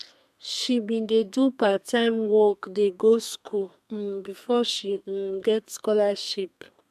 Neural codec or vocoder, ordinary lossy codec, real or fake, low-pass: codec, 32 kHz, 1.9 kbps, SNAC; MP3, 96 kbps; fake; 14.4 kHz